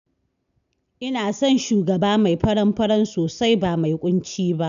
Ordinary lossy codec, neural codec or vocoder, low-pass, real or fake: none; none; 7.2 kHz; real